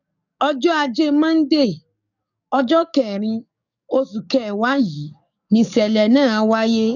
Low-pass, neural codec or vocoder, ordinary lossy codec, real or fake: 7.2 kHz; codec, 44.1 kHz, 7.8 kbps, DAC; none; fake